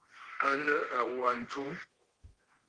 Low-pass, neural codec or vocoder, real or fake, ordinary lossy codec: 9.9 kHz; codec, 24 kHz, 0.9 kbps, DualCodec; fake; Opus, 16 kbps